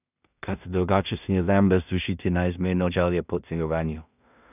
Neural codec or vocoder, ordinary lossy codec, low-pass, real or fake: codec, 16 kHz in and 24 kHz out, 0.4 kbps, LongCat-Audio-Codec, two codebook decoder; none; 3.6 kHz; fake